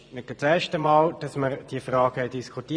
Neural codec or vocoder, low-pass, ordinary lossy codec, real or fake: vocoder, 24 kHz, 100 mel bands, Vocos; 9.9 kHz; none; fake